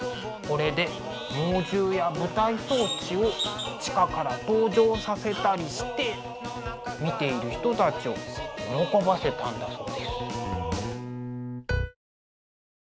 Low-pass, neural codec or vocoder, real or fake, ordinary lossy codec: none; none; real; none